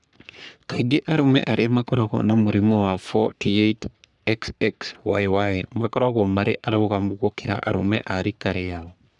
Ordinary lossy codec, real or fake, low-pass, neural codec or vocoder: none; fake; 10.8 kHz; codec, 44.1 kHz, 3.4 kbps, Pupu-Codec